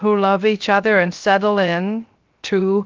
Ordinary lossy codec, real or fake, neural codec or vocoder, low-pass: Opus, 32 kbps; fake; codec, 16 kHz, 0.3 kbps, FocalCodec; 7.2 kHz